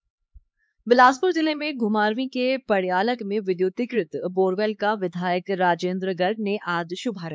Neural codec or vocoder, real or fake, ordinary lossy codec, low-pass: codec, 16 kHz, 4 kbps, X-Codec, HuBERT features, trained on LibriSpeech; fake; none; none